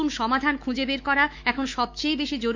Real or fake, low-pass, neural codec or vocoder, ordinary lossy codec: fake; 7.2 kHz; autoencoder, 48 kHz, 128 numbers a frame, DAC-VAE, trained on Japanese speech; MP3, 48 kbps